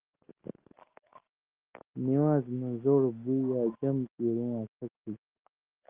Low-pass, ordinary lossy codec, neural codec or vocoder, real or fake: 3.6 kHz; Opus, 16 kbps; none; real